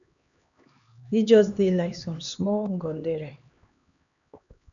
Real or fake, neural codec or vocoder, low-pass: fake; codec, 16 kHz, 2 kbps, X-Codec, HuBERT features, trained on LibriSpeech; 7.2 kHz